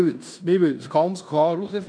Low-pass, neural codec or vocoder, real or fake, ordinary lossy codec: 9.9 kHz; codec, 16 kHz in and 24 kHz out, 0.9 kbps, LongCat-Audio-Codec, fine tuned four codebook decoder; fake; none